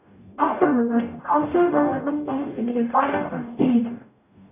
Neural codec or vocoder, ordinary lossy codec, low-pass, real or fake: codec, 44.1 kHz, 0.9 kbps, DAC; none; 3.6 kHz; fake